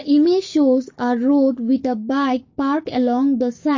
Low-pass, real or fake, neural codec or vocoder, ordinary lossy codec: 7.2 kHz; fake; codec, 16 kHz, 6 kbps, DAC; MP3, 32 kbps